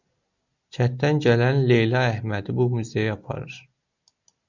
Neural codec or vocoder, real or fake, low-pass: none; real; 7.2 kHz